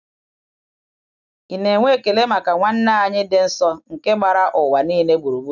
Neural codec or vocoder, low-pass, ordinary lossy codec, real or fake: none; 7.2 kHz; none; real